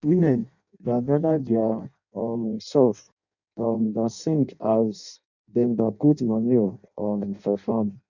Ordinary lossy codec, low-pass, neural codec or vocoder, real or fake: none; 7.2 kHz; codec, 16 kHz in and 24 kHz out, 0.6 kbps, FireRedTTS-2 codec; fake